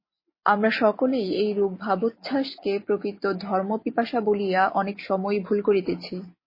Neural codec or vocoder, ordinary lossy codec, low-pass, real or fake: none; MP3, 24 kbps; 5.4 kHz; real